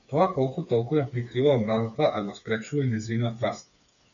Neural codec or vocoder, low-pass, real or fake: codec, 16 kHz, 4 kbps, FreqCodec, smaller model; 7.2 kHz; fake